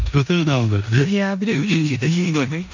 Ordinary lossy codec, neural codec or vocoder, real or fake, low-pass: none; codec, 16 kHz in and 24 kHz out, 0.9 kbps, LongCat-Audio-Codec, four codebook decoder; fake; 7.2 kHz